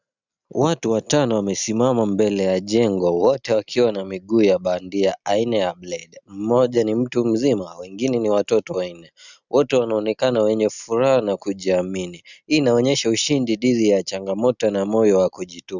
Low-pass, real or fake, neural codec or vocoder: 7.2 kHz; real; none